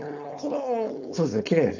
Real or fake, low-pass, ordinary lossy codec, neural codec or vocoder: fake; 7.2 kHz; AAC, 48 kbps; codec, 16 kHz, 4.8 kbps, FACodec